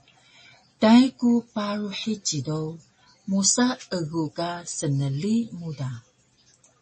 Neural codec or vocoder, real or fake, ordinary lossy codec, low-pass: none; real; MP3, 32 kbps; 9.9 kHz